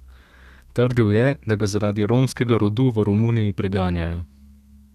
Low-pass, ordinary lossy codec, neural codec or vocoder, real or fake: 14.4 kHz; none; codec, 32 kHz, 1.9 kbps, SNAC; fake